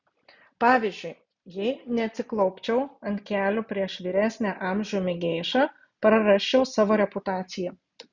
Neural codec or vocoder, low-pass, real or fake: none; 7.2 kHz; real